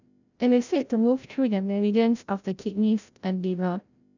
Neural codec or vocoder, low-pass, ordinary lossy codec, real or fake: codec, 16 kHz, 0.5 kbps, FreqCodec, larger model; 7.2 kHz; none; fake